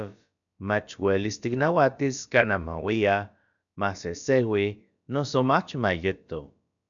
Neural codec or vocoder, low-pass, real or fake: codec, 16 kHz, about 1 kbps, DyCAST, with the encoder's durations; 7.2 kHz; fake